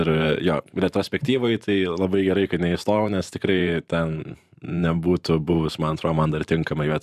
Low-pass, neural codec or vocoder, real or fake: 14.4 kHz; vocoder, 44.1 kHz, 128 mel bands every 512 samples, BigVGAN v2; fake